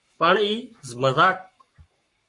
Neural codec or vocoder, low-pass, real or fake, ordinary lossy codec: codec, 44.1 kHz, 7.8 kbps, Pupu-Codec; 10.8 kHz; fake; MP3, 48 kbps